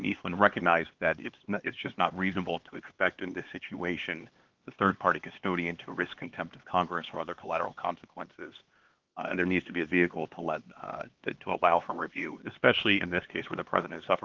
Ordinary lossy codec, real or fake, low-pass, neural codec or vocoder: Opus, 16 kbps; fake; 7.2 kHz; codec, 16 kHz, 2 kbps, X-Codec, HuBERT features, trained on LibriSpeech